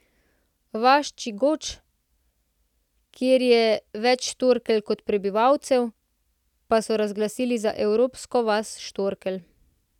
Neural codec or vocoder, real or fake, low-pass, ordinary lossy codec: none; real; 19.8 kHz; none